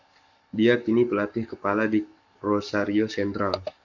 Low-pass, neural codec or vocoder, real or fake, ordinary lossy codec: 7.2 kHz; codec, 44.1 kHz, 7.8 kbps, Pupu-Codec; fake; MP3, 64 kbps